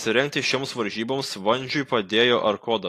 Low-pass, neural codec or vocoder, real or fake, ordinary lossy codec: 14.4 kHz; none; real; AAC, 48 kbps